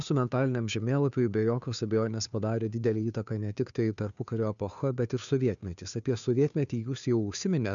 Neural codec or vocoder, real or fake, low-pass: codec, 16 kHz, 2 kbps, FunCodec, trained on Chinese and English, 25 frames a second; fake; 7.2 kHz